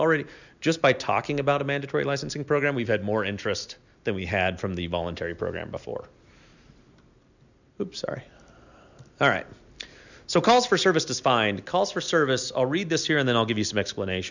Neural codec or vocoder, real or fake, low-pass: none; real; 7.2 kHz